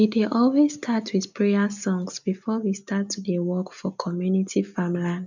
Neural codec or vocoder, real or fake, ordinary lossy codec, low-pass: none; real; none; 7.2 kHz